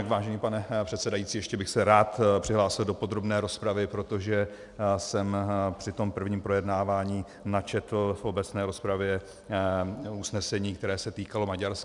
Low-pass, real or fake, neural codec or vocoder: 10.8 kHz; real; none